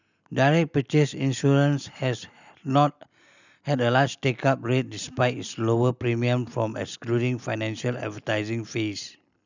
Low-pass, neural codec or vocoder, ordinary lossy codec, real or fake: 7.2 kHz; none; none; real